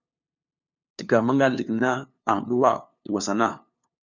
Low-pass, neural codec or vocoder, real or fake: 7.2 kHz; codec, 16 kHz, 2 kbps, FunCodec, trained on LibriTTS, 25 frames a second; fake